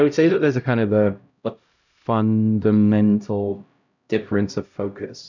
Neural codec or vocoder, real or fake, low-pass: codec, 16 kHz, 0.5 kbps, X-Codec, HuBERT features, trained on LibriSpeech; fake; 7.2 kHz